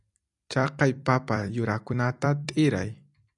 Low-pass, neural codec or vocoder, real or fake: 10.8 kHz; vocoder, 44.1 kHz, 128 mel bands every 256 samples, BigVGAN v2; fake